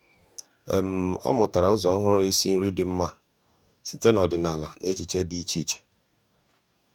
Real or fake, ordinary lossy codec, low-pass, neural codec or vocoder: fake; MP3, 96 kbps; 19.8 kHz; codec, 44.1 kHz, 2.6 kbps, DAC